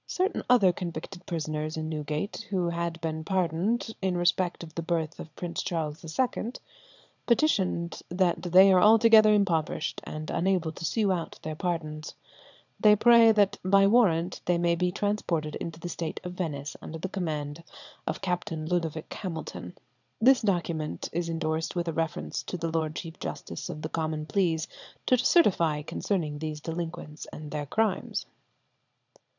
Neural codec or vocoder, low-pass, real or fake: vocoder, 22.05 kHz, 80 mel bands, Vocos; 7.2 kHz; fake